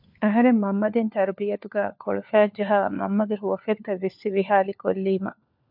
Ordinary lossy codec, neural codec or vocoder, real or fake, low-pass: MP3, 48 kbps; codec, 16 kHz, 4 kbps, FunCodec, trained on LibriTTS, 50 frames a second; fake; 5.4 kHz